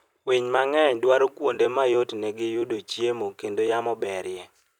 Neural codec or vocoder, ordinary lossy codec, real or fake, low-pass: vocoder, 44.1 kHz, 128 mel bands every 256 samples, BigVGAN v2; none; fake; 19.8 kHz